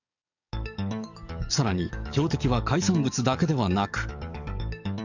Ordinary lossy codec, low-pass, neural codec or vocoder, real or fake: none; 7.2 kHz; codec, 44.1 kHz, 7.8 kbps, DAC; fake